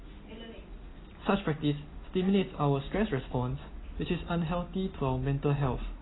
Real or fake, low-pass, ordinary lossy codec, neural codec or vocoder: real; 7.2 kHz; AAC, 16 kbps; none